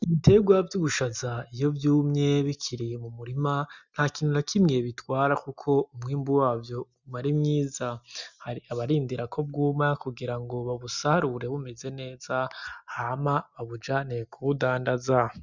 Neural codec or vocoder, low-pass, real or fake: none; 7.2 kHz; real